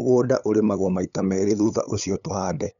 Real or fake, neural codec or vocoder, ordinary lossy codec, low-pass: fake; codec, 16 kHz, 8 kbps, FunCodec, trained on LibriTTS, 25 frames a second; none; 7.2 kHz